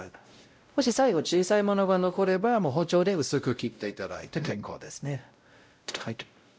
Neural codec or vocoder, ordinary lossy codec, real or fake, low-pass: codec, 16 kHz, 0.5 kbps, X-Codec, WavLM features, trained on Multilingual LibriSpeech; none; fake; none